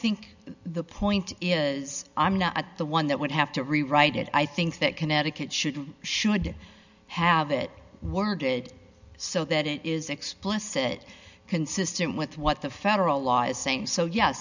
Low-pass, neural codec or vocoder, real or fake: 7.2 kHz; none; real